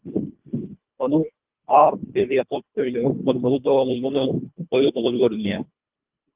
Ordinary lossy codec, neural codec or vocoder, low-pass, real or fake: Opus, 32 kbps; codec, 24 kHz, 1.5 kbps, HILCodec; 3.6 kHz; fake